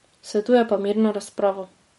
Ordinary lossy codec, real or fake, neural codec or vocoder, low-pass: MP3, 48 kbps; real; none; 19.8 kHz